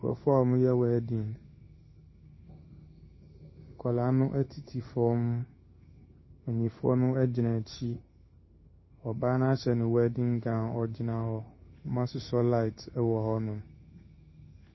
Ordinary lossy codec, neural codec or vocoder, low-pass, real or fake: MP3, 24 kbps; codec, 16 kHz in and 24 kHz out, 1 kbps, XY-Tokenizer; 7.2 kHz; fake